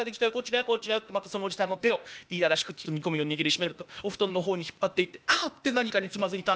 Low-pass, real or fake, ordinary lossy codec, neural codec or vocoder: none; fake; none; codec, 16 kHz, 0.8 kbps, ZipCodec